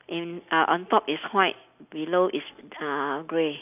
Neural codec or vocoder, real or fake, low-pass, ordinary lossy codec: none; real; 3.6 kHz; none